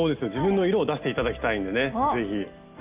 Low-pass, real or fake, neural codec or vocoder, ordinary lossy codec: 3.6 kHz; real; none; Opus, 24 kbps